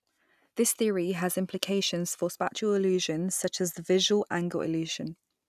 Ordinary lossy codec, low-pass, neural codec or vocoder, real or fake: none; 14.4 kHz; none; real